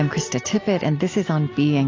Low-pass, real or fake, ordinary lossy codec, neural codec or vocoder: 7.2 kHz; real; AAC, 32 kbps; none